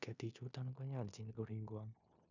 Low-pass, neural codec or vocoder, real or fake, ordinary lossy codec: 7.2 kHz; codec, 16 kHz in and 24 kHz out, 0.9 kbps, LongCat-Audio-Codec, four codebook decoder; fake; none